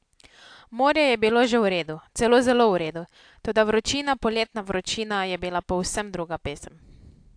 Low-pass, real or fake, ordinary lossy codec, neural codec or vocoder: 9.9 kHz; real; AAC, 64 kbps; none